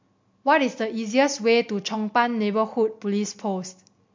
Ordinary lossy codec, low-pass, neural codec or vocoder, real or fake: MP3, 48 kbps; 7.2 kHz; none; real